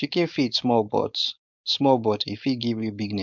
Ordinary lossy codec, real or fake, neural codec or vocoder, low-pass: MP3, 64 kbps; fake; codec, 16 kHz, 4.8 kbps, FACodec; 7.2 kHz